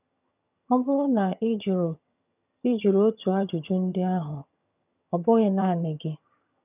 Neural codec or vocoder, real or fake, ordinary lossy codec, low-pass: vocoder, 22.05 kHz, 80 mel bands, HiFi-GAN; fake; none; 3.6 kHz